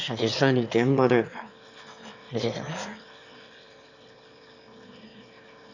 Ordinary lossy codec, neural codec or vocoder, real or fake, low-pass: none; autoencoder, 22.05 kHz, a latent of 192 numbers a frame, VITS, trained on one speaker; fake; 7.2 kHz